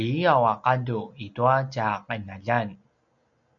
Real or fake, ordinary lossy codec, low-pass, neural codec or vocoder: real; MP3, 64 kbps; 7.2 kHz; none